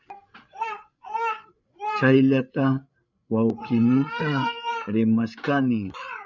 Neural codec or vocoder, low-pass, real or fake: codec, 16 kHz, 8 kbps, FreqCodec, larger model; 7.2 kHz; fake